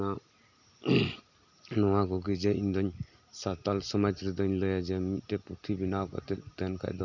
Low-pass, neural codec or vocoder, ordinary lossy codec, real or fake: 7.2 kHz; none; none; real